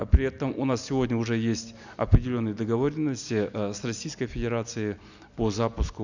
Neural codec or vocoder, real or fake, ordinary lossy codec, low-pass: none; real; none; 7.2 kHz